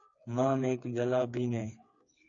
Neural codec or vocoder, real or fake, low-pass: codec, 16 kHz, 4 kbps, FreqCodec, smaller model; fake; 7.2 kHz